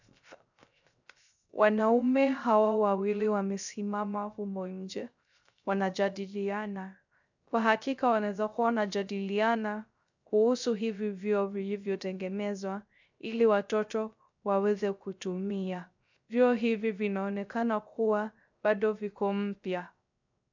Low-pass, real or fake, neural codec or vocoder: 7.2 kHz; fake; codec, 16 kHz, 0.3 kbps, FocalCodec